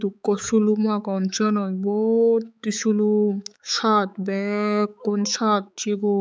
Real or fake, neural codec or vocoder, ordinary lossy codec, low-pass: fake; codec, 16 kHz, 4 kbps, X-Codec, HuBERT features, trained on balanced general audio; none; none